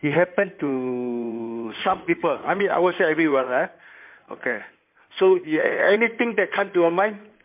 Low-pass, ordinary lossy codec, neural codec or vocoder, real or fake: 3.6 kHz; MP3, 32 kbps; codec, 16 kHz in and 24 kHz out, 2.2 kbps, FireRedTTS-2 codec; fake